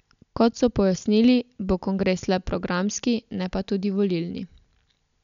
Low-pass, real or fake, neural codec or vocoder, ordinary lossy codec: 7.2 kHz; real; none; none